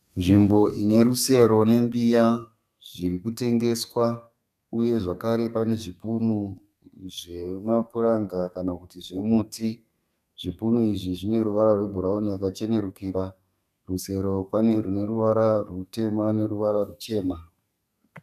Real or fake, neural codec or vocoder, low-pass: fake; codec, 32 kHz, 1.9 kbps, SNAC; 14.4 kHz